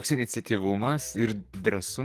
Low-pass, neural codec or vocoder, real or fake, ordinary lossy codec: 14.4 kHz; codec, 44.1 kHz, 2.6 kbps, SNAC; fake; Opus, 24 kbps